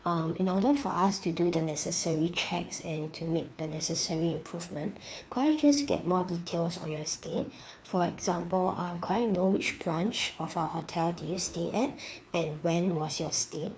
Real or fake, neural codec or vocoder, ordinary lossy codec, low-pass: fake; codec, 16 kHz, 2 kbps, FreqCodec, larger model; none; none